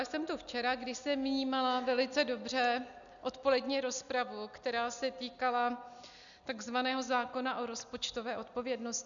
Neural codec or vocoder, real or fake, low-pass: none; real; 7.2 kHz